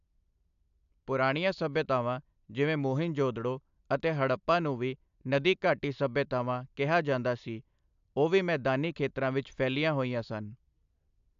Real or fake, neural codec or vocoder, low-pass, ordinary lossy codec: real; none; 7.2 kHz; AAC, 96 kbps